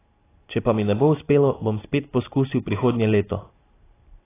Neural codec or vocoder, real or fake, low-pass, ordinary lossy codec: none; real; 3.6 kHz; AAC, 16 kbps